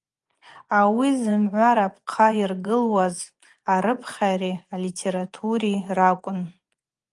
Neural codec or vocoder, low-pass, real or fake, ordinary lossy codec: vocoder, 24 kHz, 100 mel bands, Vocos; 10.8 kHz; fake; Opus, 32 kbps